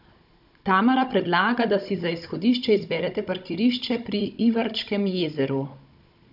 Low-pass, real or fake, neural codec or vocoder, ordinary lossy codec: 5.4 kHz; fake; codec, 16 kHz, 16 kbps, FunCodec, trained on Chinese and English, 50 frames a second; none